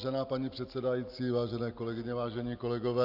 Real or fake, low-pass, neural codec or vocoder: real; 5.4 kHz; none